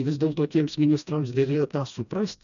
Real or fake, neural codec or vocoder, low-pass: fake; codec, 16 kHz, 1 kbps, FreqCodec, smaller model; 7.2 kHz